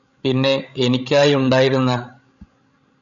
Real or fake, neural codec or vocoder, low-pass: fake; codec, 16 kHz, 16 kbps, FreqCodec, larger model; 7.2 kHz